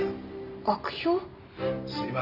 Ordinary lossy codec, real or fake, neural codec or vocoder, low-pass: AAC, 48 kbps; real; none; 5.4 kHz